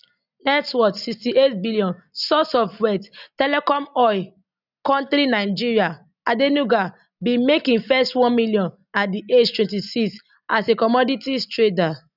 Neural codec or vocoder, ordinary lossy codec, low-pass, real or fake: none; none; 5.4 kHz; real